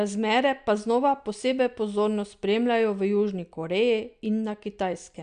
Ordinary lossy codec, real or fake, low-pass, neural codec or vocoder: MP3, 64 kbps; real; 9.9 kHz; none